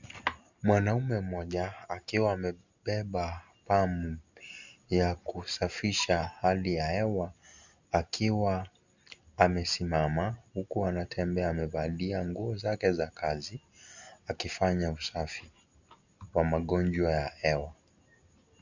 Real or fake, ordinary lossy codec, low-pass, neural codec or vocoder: real; Opus, 64 kbps; 7.2 kHz; none